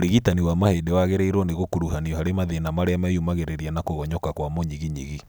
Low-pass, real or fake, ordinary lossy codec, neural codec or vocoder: none; real; none; none